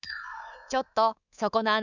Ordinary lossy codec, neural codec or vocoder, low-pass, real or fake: none; codec, 24 kHz, 3.1 kbps, DualCodec; 7.2 kHz; fake